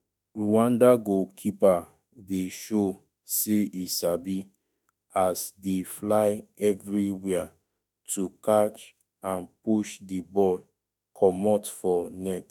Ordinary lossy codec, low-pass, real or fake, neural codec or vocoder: none; 19.8 kHz; fake; autoencoder, 48 kHz, 32 numbers a frame, DAC-VAE, trained on Japanese speech